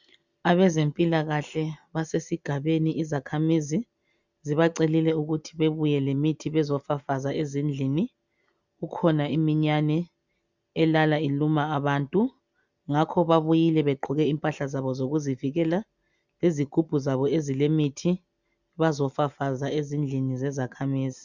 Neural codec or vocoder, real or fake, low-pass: none; real; 7.2 kHz